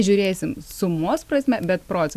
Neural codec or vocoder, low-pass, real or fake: none; 14.4 kHz; real